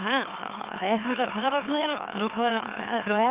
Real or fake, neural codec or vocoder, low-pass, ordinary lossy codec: fake; autoencoder, 44.1 kHz, a latent of 192 numbers a frame, MeloTTS; 3.6 kHz; Opus, 32 kbps